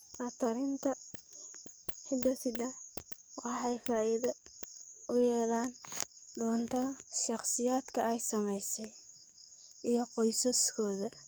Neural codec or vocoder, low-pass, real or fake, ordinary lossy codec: codec, 44.1 kHz, 7.8 kbps, DAC; none; fake; none